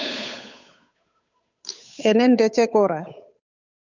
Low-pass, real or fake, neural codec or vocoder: 7.2 kHz; fake; codec, 16 kHz, 8 kbps, FunCodec, trained on Chinese and English, 25 frames a second